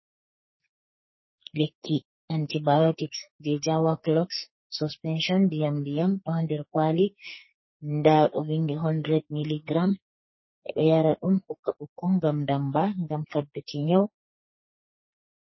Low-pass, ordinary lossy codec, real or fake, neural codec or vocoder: 7.2 kHz; MP3, 24 kbps; fake; codec, 44.1 kHz, 2.6 kbps, SNAC